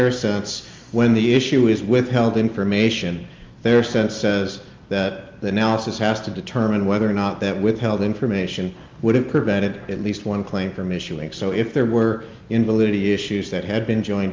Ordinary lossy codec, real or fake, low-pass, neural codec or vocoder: Opus, 32 kbps; real; 7.2 kHz; none